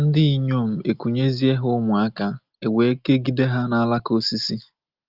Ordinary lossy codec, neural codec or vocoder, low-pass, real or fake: Opus, 24 kbps; none; 5.4 kHz; real